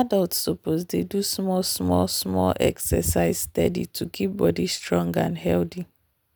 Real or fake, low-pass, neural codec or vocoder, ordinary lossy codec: real; none; none; none